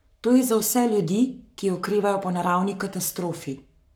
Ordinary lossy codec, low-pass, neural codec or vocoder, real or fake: none; none; codec, 44.1 kHz, 7.8 kbps, Pupu-Codec; fake